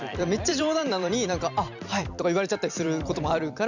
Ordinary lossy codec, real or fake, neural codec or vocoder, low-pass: none; fake; vocoder, 44.1 kHz, 128 mel bands every 256 samples, BigVGAN v2; 7.2 kHz